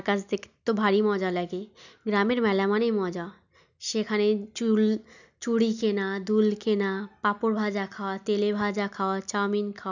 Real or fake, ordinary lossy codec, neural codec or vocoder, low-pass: real; none; none; 7.2 kHz